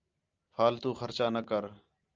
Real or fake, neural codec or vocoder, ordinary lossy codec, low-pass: real; none; Opus, 24 kbps; 7.2 kHz